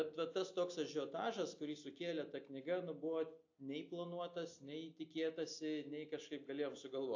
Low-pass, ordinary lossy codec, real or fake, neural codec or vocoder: 7.2 kHz; AAC, 48 kbps; real; none